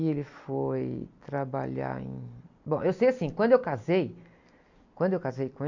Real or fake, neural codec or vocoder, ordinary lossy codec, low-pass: fake; vocoder, 44.1 kHz, 128 mel bands every 256 samples, BigVGAN v2; MP3, 64 kbps; 7.2 kHz